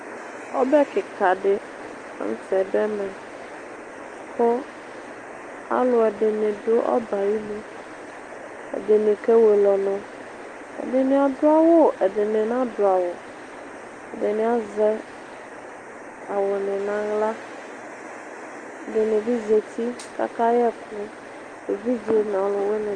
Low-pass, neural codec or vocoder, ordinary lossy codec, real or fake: 9.9 kHz; none; Opus, 24 kbps; real